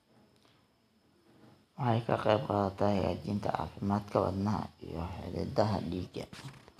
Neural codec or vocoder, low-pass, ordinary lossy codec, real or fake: none; 14.4 kHz; none; real